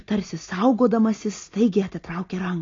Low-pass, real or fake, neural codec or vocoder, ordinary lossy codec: 7.2 kHz; real; none; AAC, 32 kbps